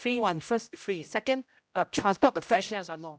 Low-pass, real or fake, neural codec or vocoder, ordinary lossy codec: none; fake; codec, 16 kHz, 0.5 kbps, X-Codec, HuBERT features, trained on general audio; none